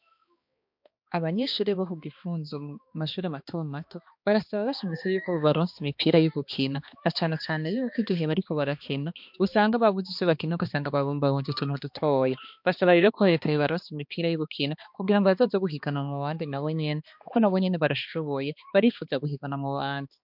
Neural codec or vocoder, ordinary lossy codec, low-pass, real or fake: codec, 16 kHz, 2 kbps, X-Codec, HuBERT features, trained on balanced general audio; MP3, 48 kbps; 5.4 kHz; fake